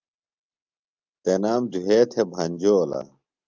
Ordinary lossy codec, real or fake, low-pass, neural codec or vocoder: Opus, 24 kbps; real; 7.2 kHz; none